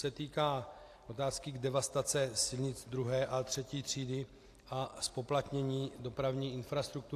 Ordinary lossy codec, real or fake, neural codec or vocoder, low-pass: AAC, 64 kbps; real; none; 14.4 kHz